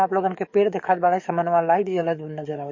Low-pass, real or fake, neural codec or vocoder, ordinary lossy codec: 7.2 kHz; fake; codec, 44.1 kHz, 7.8 kbps, DAC; MP3, 32 kbps